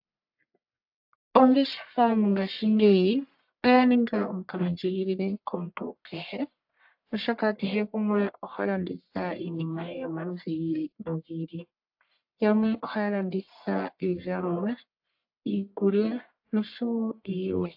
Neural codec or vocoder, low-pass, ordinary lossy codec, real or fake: codec, 44.1 kHz, 1.7 kbps, Pupu-Codec; 5.4 kHz; AAC, 48 kbps; fake